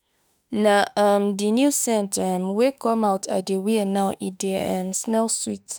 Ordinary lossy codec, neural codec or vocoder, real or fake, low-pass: none; autoencoder, 48 kHz, 32 numbers a frame, DAC-VAE, trained on Japanese speech; fake; none